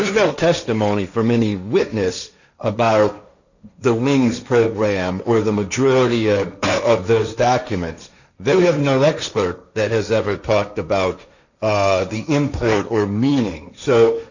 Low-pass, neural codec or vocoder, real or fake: 7.2 kHz; codec, 16 kHz, 1.1 kbps, Voila-Tokenizer; fake